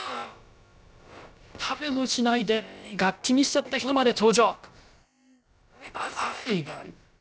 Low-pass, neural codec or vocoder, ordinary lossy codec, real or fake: none; codec, 16 kHz, about 1 kbps, DyCAST, with the encoder's durations; none; fake